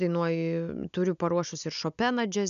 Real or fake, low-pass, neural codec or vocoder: real; 7.2 kHz; none